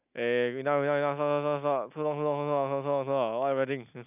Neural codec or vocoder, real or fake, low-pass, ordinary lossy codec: none; real; 3.6 kHz; none